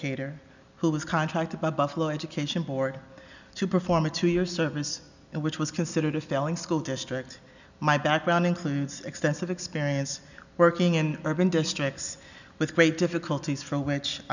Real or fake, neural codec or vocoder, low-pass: real; none; 7.2 kHz